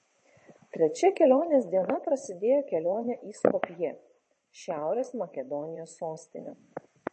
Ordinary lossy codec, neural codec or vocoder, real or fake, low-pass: MP3, 32 kbps; vocoder, 22.05 kHz, 80 mel bands, WaveNeXt; fake; 9.9 kHz